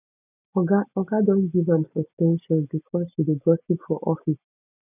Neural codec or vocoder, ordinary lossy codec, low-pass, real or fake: none; none; 3.6 kHz; real